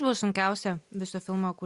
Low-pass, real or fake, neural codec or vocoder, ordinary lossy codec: 10.8 kHz; real; none; Opus, 64 kbps